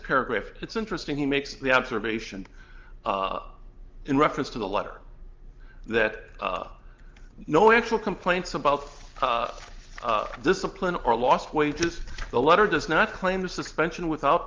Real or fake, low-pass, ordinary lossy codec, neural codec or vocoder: real; 7.2 kHz; Opus, 32 kbps; none